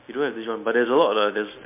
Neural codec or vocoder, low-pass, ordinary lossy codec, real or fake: none; 3.6 kHz; MP3, 32 kbps; real